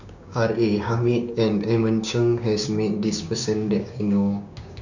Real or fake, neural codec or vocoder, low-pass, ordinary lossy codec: fake; codec, 16 kHz, 6 kbps, DAC; 7.2 kHz; none